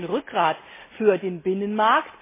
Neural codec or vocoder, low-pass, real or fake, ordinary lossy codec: none; 3.6 kHz; real; MP3, 16 kbps